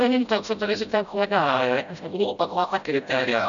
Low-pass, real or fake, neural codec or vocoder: 7.2 kHz; fake; codec, 16 kHz, 0.5 kbps, FreqCodec, smaller model